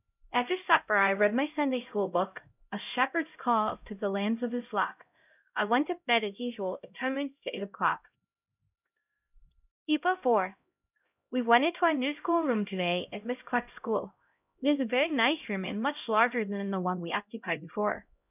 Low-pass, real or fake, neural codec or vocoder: 3.6 kHz; fake; codec, 16 kHz, 0.5 kbps, X-Codec, HuBERT features, trained on LibriSpeech